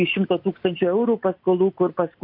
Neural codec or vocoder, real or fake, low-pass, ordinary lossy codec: none; real; 5.4 kHz; MP3, 48 kbps